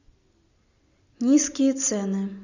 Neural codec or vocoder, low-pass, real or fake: none; 7.2 kHz; real